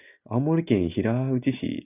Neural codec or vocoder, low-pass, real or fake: none; 3.6 kHz; real